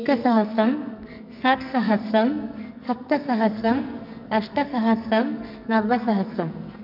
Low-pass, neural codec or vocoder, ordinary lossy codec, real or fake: 5.4 kHz; codec, 44.1 kHz, 2.6 kbps, SNAC; none; fake